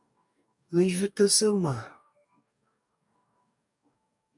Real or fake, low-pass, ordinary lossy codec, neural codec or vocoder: fake; 10.8 kHz; MP3, 96 kbps; codec, 44.1 kHz, 2.6 kbps, DAC